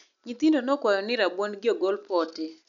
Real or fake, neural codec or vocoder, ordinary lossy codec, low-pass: real; none; none; 7.2 kHz